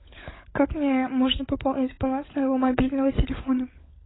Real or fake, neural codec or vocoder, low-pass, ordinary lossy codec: fake; codec, 16 kHz, 16 kbps, FunCodec, trained on LibriTTS, 50 frames a second; 7.2 kHz; AAC, 16 kbps